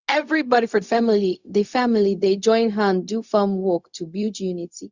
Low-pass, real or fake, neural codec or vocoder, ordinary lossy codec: 7.2 kHz; fake; codec, 16 kHz, 0.4 kbps, LongCat-Audio-Codec; Opus, 64 kbps